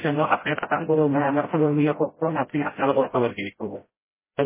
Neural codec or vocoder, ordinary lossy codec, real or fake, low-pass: codec, 16 kHz, 0.5 kbps, FreqCodec, smaller model; MP3, 16 kbps; fake; 3.6 kHz